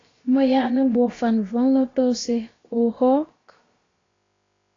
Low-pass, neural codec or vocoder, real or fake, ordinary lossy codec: 7.2 kHz; codec, 16 kHz, about 1 kbps, DyCAST, with the encoder's durations; fake; AAC, 32 kbps